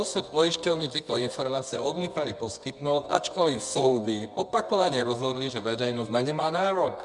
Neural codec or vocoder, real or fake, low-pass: codec, 24 kHz, 0.9 kbps, WavTokenizer, medium music audio release; fake; 10.8 kHz